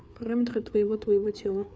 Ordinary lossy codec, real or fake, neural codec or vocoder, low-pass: none; fake; codec, 16 kHz, 4 kbps, FreqCodec, larger model; none